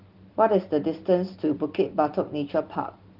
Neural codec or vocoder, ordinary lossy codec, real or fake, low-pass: none; Opus, 16 kbps; real; 5.4 kHz